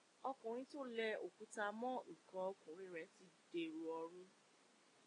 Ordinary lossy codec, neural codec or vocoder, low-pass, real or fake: AAC, 32 kbps; none; 9.9 kHz; real